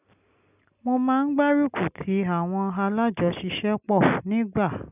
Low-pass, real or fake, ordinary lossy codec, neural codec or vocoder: 3.6 kHz; real; none; none